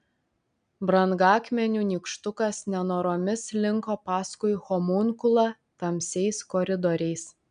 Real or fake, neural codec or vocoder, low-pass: real; none; 9.9 kHz